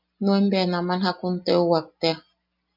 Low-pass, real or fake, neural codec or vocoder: 5.4 kHz; real; none